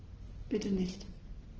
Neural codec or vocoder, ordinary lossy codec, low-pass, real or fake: none; Opus, 16 kbps; 7.2 kHz; real